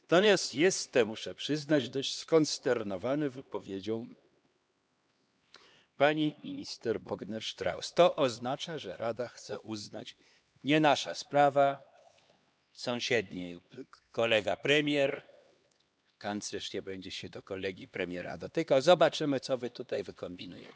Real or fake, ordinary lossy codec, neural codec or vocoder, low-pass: fake; none; codec, 16 kHz, 2 kbps, X-Codec, HuBERT features, trained on LibriSpeech; none